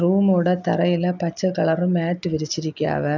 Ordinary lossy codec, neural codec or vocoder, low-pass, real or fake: none; none; 7.2 kHz; real